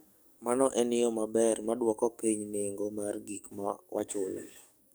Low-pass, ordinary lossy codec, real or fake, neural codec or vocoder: none; none; fake; codec, 44.1 kHz, 7.8 kbps, DAC